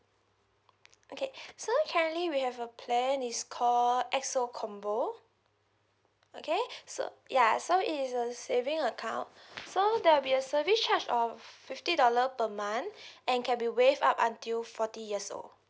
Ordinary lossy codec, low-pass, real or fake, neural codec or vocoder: none; none; real; none